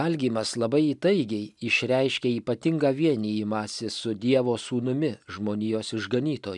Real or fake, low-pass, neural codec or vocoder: real; 10.8 kHz; none